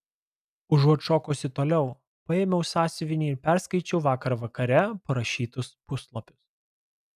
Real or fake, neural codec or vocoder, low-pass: real; none; 14.4 kHz